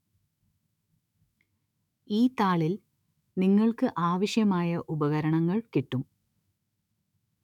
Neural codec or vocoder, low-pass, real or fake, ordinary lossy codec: autoencoder, 48 kHz, 128 numbers a frame, DAC-VAE, trained on Japanese speech; 19.8 kHz; fake; none